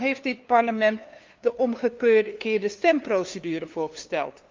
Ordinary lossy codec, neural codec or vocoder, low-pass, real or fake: Opus, 24 kbps; codec, 16 kHz, 2 kbps, FunCodec, trained on LibriTTS, 25 frames a second; 7.2 kHz; fake